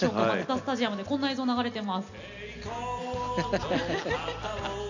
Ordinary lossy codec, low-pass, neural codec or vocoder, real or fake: none; 7.2 kHz; none; real